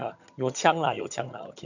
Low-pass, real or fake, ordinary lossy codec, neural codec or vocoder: 7.2 kHz; fake; none; vocoder, 22.05 kHz, 80 mel bands, HiFi-GAN